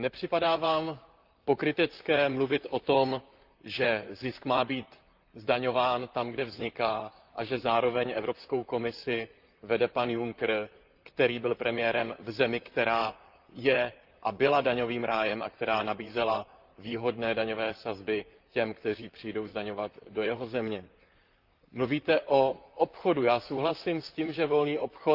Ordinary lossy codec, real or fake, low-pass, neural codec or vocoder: Opus, 32 kbps; fake; 5.4 kHz; vocoder, 44.1 kHz, 128 mel bands, Pupu-Vocoder